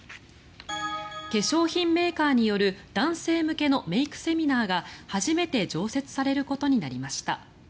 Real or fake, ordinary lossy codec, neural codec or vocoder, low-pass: real; none; none; none